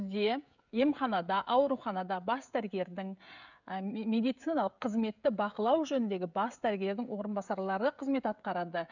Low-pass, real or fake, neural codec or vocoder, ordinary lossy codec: 7.2 kHz; fake; codec, 16 kHz, 16 kbps, FreqCodec, smaller model; none